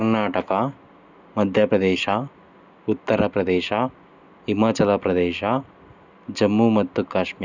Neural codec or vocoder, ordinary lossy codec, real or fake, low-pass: none; none; real; 7.2 kHz